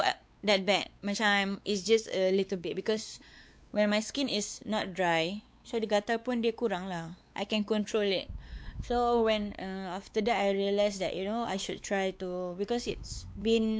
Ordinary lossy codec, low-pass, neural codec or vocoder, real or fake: none; none; codec, 16 kHz, 4 kbps, X-Codec, WavLM features, trained on Multilingual LibriSpeech; fake